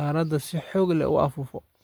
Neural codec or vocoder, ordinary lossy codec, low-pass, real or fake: none; none; none; real